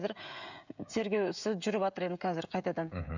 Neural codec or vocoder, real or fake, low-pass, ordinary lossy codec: codec, 16 kHz, 16 kbps, FreqCodec, smaller model; fake; 7.2 kHz; none